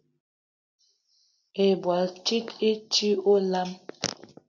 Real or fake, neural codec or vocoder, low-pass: real; none; 7.2 kHz